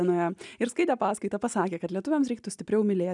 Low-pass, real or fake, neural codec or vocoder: 10.8 kHz; real; none